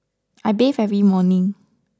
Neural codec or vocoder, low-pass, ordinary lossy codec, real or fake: none; none; none; real